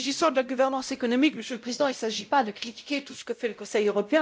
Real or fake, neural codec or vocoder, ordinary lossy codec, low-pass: fake; codec, 16 kHz, 0.5 kbps, X-Codec, WavLM features, trained on Multilingual LibriSpeech; none; none